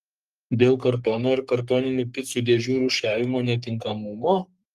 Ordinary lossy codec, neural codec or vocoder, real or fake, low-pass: Opus, 32 kbps; codec, 44.1 kHz, 3.4 kbps, Pupu-Codec; fake; 14.4 kHz